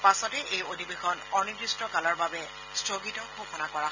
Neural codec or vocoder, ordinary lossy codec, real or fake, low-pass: none; none; real; 7.2 kHz